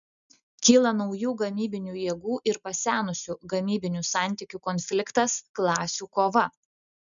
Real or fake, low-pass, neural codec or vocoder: real; 7.2 kHz; none